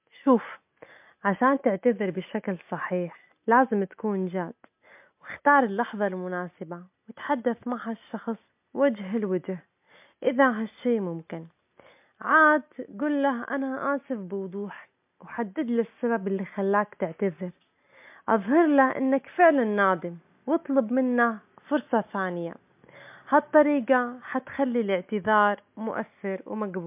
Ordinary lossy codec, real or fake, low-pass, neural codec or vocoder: MP3, 32 kbps; real; 3.6 kHz; none